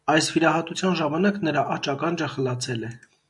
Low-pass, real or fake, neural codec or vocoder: 10.8 kHz; real; none